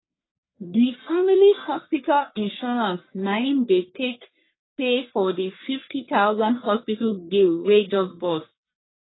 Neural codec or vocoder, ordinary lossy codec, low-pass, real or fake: codec, 44.1 kHz, 1.7 kbps, Pupu-Codec; AAC, 16 kbps; 7.2 kHz; fake